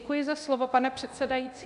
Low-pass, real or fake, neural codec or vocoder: 10.8 kHz; fake; codec, 24 kHz, 0.9 kbps, DualCodec